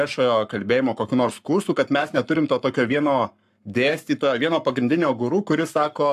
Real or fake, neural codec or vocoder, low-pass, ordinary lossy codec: fake; codec, 44.1 kHz, 7.8 kbps, Pupu-Codec; 14.4 kHz; AAC, 96 kbps